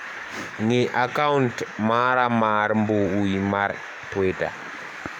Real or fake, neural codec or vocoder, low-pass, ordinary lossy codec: fake; codec, 44.1 kHz, 7.8 kbps, DAC; 19.8 kHz; none